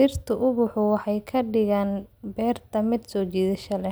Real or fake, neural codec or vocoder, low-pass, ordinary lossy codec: real; none; none; none